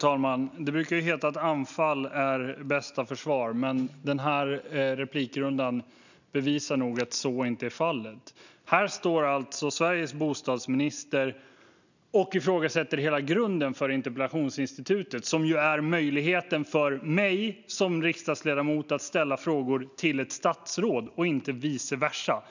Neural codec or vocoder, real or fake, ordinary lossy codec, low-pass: none; real; none; 7.2 kHz